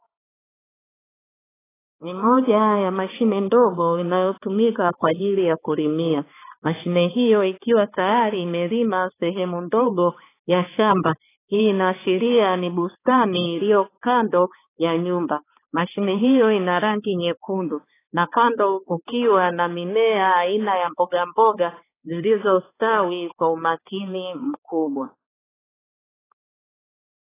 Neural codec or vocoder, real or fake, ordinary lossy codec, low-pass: codec, 16 kHz, 4 kbps, X-Codec, HuBERT features, trained on balanced general audio; fake; AAC, 16 kbps; 3.6 kHz